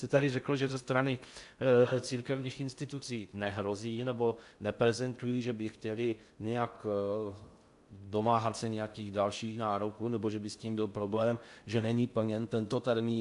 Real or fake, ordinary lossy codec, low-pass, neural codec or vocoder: fake; AAC, 64 kbps; 10.8 kHz; codec, 16 kHz in and 24 kHz out, 0.6 kbps, FocalCodec, streaming, 2048 codes